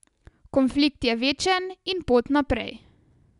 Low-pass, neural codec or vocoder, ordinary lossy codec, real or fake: 10.8 kHz; none; none; real